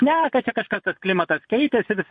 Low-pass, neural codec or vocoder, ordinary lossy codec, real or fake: 9.9 kHz; vocoder, 22.05 kHz, 80 mel bands, Vocos; MP3, 48 kbps; fake